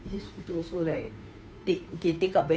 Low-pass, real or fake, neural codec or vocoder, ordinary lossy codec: none; fake; codec, 16 kHz, 2 kbps, FunCodec, trained on Chinese and English, 25 frames a second; none